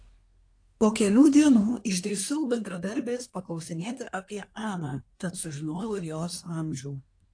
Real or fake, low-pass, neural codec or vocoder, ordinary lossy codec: fake; 9.9 kHz; codec, 24 kHz, 1 kbps, SNAC; AAC, 48 kbps